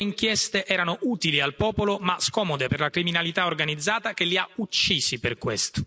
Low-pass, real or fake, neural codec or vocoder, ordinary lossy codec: none; real; none; none